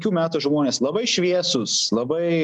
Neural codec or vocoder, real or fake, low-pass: none; real; 10.8 kHz